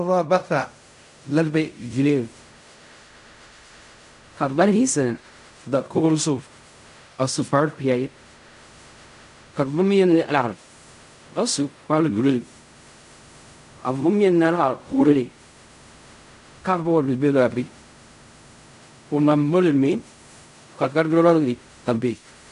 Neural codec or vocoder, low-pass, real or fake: codec, 16 kHz in and 24 kHz out, 0.4 kbps, LongCat-Audio-Codec, fine tuned four codebook decoder; 10.8 kHz; fake